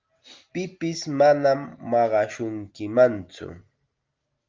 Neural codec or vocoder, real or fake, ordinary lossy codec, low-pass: none; real; Opus, 32 kbps; 7.2 kHz